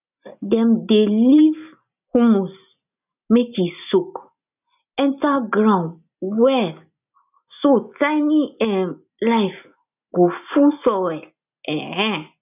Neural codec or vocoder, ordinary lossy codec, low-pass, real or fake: none; none; 3.6 kHz; real